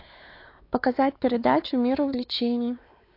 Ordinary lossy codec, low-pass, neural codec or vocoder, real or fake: AAC, 32 kbps; 5.4 kHz; codec, 16 kHz, 4 kbps, X-Codec, WavLM features, trained on Multilingual LibriSpeech; fake